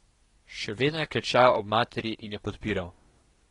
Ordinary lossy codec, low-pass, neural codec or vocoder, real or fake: AAC, 32 kbps; 10.8 kHz; codec, 24 kHz, 1 kbps, SNAC; fake